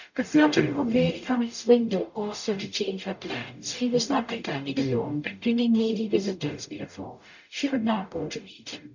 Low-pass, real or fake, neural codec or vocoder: 7.2 kHz; fake; codec, 44.1 kHz, 0.9 kbps, DAC